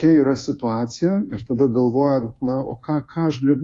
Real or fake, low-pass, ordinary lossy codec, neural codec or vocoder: fake; 10.8 kHz; AAC, 48 kbps; codec, 24 kHz, 1.2 kbps, DualCodec